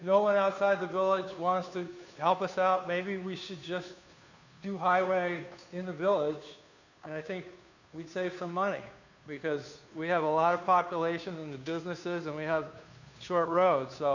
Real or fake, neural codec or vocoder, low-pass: fake; codec, 16 kHz, 2 kbps, FunCodec, trained on Chinese and English, 25 frames a second; 7.2 kHz